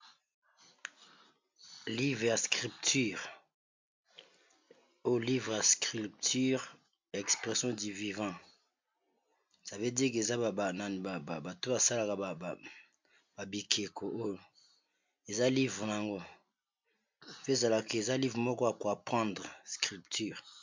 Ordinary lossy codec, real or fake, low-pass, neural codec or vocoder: MP3, 64 kbps; real; 7.2 kHz; none